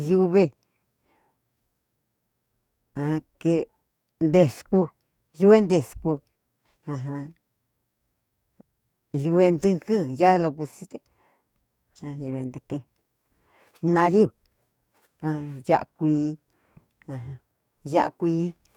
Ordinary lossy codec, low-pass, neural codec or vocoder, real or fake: none; 19.8 kHz; codec, 44.1 kHz, 2.6 kbps, DAC; fake